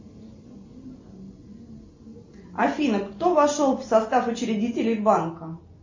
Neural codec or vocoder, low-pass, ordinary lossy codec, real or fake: none; 7.2 kHz; MP3, 32 kbps; real